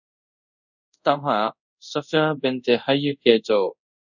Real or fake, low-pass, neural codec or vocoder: fake; 7.2 kHz; codec, 24 kHz, 0.5 kbps, DualCodec